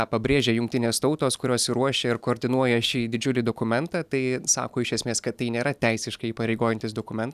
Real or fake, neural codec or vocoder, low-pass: real; none; 14.4 kHz